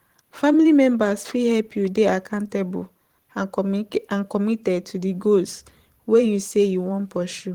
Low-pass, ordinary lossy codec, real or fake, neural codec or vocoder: 19.8 kHz; Opus, 16 kbps; fake; vocoder, 44.1 kHz, 128 mel bands every 512 samples, BigVGAN v2